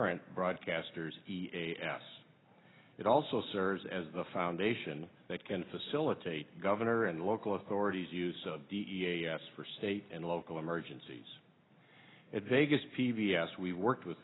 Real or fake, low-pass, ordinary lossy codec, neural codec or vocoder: real; 7.2 kHz; AAC, 16 kbps; none